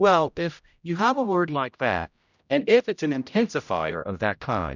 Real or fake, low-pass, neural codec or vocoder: fake; 7.2 kHz; codec, 16 kHz, 0.5 kbps, X-Codec, HuBERT features, trained on general audio